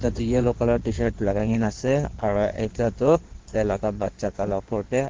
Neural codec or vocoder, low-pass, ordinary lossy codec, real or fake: codec, 16 kHz in and 24 kHz out, 1.1 kbps, FireRedTTS-2 codec; 7.2 kHz; Opus, 16 kbps; fake